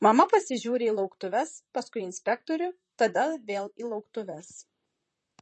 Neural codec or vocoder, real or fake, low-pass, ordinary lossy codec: vocoder, 44.1 kHz, 128 mel bands, Pupu-Vocoder; fake; 10.8 kHz; MP3, 32 kbps